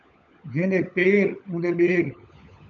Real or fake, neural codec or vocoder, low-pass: fake; codec, 16 kHz, 16 kbps, FunCodec, trained on LibriTTS, 50 frames a second; 7.2 kHz